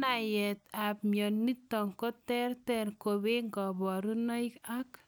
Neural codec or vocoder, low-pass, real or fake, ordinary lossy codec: none; none; real; none